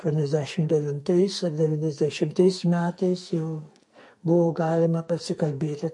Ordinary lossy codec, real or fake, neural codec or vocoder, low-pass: MP3, 48 kbps; fake; codec, 44.1 kHz, 2.6 kbps, SNAC; 10.8 kHz